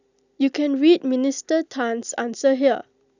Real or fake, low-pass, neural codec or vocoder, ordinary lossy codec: real; 7.2 kHz; none; none